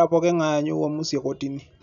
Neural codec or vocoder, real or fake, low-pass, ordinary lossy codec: none; real; 7.2 kHz; none